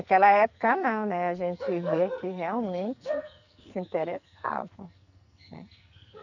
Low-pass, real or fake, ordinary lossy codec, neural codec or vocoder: 7.2 kHz; fake; none; codec, 44.1 kHz, 2.6 kbps, SNAC